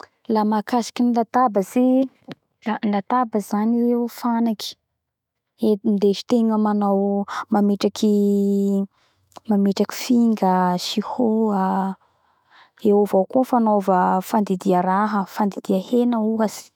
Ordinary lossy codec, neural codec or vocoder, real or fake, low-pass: none; autoencoder, 48 kHz, 128 numbers a frame, DAC-VAE, trained on Japanese speech; fake; 19.8 kHz